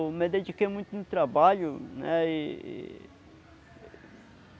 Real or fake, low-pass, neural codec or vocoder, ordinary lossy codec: real; none; none; none